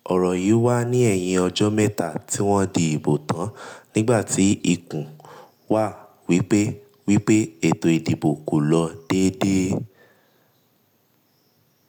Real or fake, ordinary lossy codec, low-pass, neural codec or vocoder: real; none; none; none